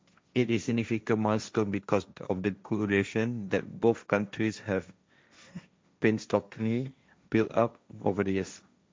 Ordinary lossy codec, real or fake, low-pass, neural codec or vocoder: none; fake; none; codec, 16 kHz, 1.1 kbps, Voila-Tokenizer